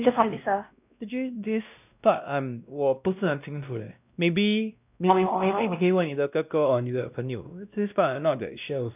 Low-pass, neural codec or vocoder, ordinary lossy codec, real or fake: 3.6 kHz; codec, 16 kHz, 1 kbps, X-Codec, WavLM features, trained on Multilingual LibriSpeech; none; fake